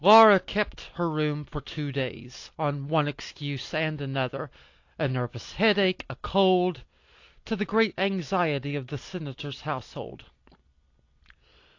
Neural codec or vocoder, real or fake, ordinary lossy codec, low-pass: none; real; AAC, 48 kbps; 7.2 kHz